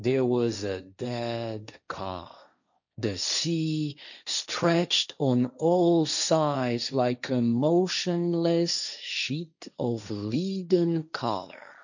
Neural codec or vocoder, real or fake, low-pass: codec, 16 kHz, 1.1 kbps, Voila-Tokenizer; fake; 7.2 kHz